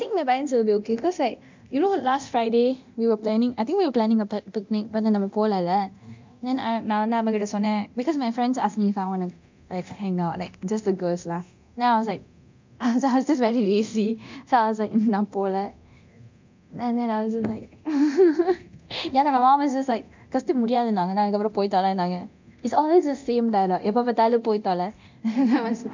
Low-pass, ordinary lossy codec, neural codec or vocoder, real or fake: 7.2 kHz; MP3, 64 kbps; codec, 24 kHz, 0.9 kbps, DualCodec; fake